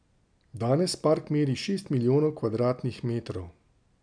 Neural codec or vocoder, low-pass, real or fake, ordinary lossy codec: none; 9.9 kHz; real; none